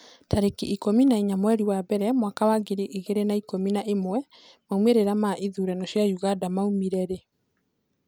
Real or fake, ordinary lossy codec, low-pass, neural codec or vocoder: real; none; none; none